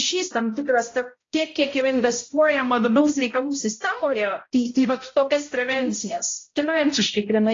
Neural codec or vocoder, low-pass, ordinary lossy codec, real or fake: codec, 16 kHz, 0.5 kbps, X-Codec, HuBERT features, trained on balanced general audio; 7.2 kHz; AAC, 32 kbps; fake